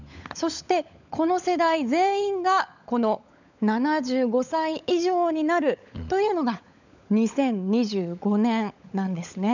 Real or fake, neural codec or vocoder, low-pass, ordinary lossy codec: fake; codec, 16 kHz, 16 kbps, FunCodec, trained on LibriTTS, 50 frames a second; 7.2 kHz; none